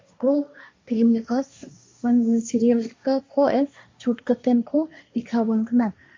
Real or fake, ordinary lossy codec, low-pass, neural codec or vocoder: fake; MP3, 48 kbps; 7.2 kHz; codec, 16 kHz, 1.1 kbps, Voila-Tokenizer